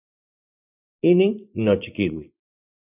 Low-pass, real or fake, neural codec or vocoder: 3.6 kHz; fake; vocoder, 24 kHz, 100 mel bands, Vocos